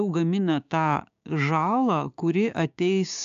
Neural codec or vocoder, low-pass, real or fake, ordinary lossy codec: codec, 16 kHz, 6 kbps, DAC; 7.2 kHz; fake; AAC, 64 kbps